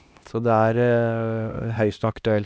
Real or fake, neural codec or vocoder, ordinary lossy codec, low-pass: fake; codec, 16 kHz, 1 kbps, X-Codec, HuBERT features, trained on LibriSpeech; none; none